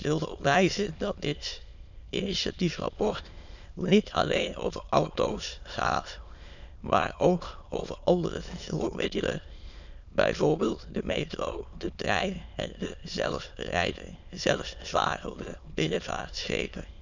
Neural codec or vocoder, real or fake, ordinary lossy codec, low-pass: autoencoder, 22.05 kHz, a latent of 192 numbers a frame, VITS, trained on many speakers; fake; none; 7.2 kHz